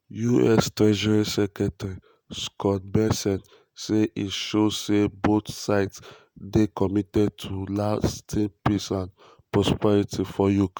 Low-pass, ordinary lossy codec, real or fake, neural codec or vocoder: none; none; fake; vocoder, 48 kHz, 128 mel bands, Vocos